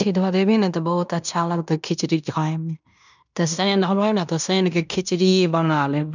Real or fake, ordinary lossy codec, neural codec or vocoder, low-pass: fake; none; codec, 16 kHz in and 24 kHz out, 0.9 kbps, LongCat-Audio-Codec, fine tuned four codebook decoder; 7.2 kHz